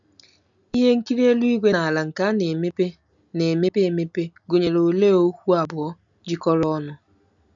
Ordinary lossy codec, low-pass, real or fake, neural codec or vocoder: none; 7.2 kHz; real; none